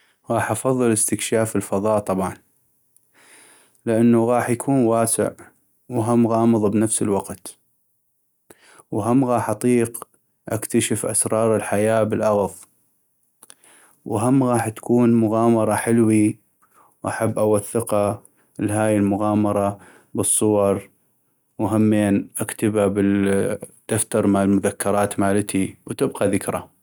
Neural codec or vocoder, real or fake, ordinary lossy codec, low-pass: none; real; none; none